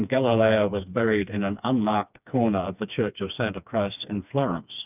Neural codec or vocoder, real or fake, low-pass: codec, 16 kHz, 2 kbps, FreqCodec, smaller model; fake; 3.6 kHz